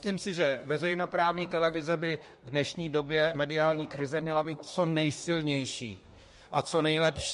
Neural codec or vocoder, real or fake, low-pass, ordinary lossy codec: codec, 24 kHz, 1 kbps, SNAC; fake; 10.8 kHz; MP3, 48 kbps